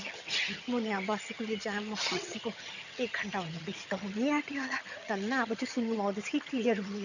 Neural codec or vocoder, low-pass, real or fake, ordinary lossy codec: vocoder, 22.05 kHz, 80 mel bands, HiFi-GAN; 7.2 kHz; fake; none